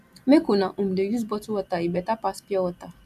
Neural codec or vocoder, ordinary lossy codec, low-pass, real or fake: none; none; 14.4 kHz; real